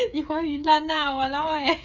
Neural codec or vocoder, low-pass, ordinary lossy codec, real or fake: codec, 16 kHz, 8 kbps, FreqCodec, smaller model; 7.2 kHz; none; fake